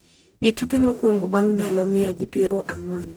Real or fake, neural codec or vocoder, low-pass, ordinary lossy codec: fake; codec, 44.1 kHz, 0.9 kbps, DAC; none; none